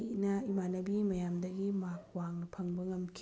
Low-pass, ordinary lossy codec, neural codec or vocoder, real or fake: none; none; none; real